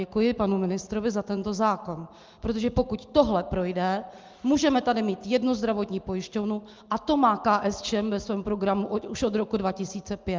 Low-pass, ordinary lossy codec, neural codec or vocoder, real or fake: 7.2 kHz; Opus, 32 kbps; none; real